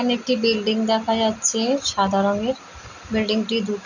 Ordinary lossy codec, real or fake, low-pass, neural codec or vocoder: none; real; 7.2 kHz; none